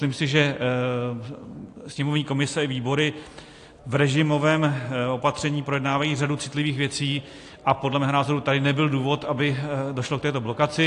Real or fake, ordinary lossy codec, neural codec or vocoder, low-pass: real; AAC, 48 kbps; none; 10.8 kHz